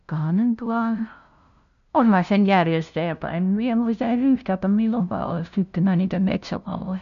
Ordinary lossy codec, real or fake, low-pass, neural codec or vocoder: none; fake; 7.2 kHz; codec, 16 kHz, 0.5 kbps, FunCodec, trained on LibriTTS, 25 frames a second